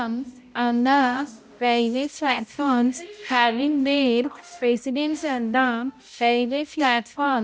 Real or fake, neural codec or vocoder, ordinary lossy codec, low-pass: fake; codec, 16 kHz, 0.5 kbps, X-Codec, HuBERT features, trained on balanced general audio; none; none